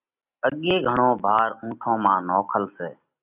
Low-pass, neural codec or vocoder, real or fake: 3.6 kHz; none; real